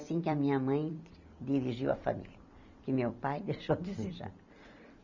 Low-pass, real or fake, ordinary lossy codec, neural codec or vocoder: 7.2 kHz; fake; none; vocoder, 44.1 kHz, 128 mel bands every 256 samples, BigVGAN v2